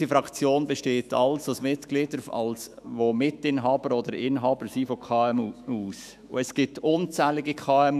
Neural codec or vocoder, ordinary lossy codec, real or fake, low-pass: autoencoder, 48 kHz, 128 numbers a frame, DAC-VAE, trained on Japanese speech; none; fake; 14.4 kHz